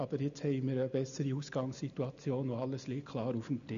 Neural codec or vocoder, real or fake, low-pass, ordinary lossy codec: none; real; 7.2 kHz; none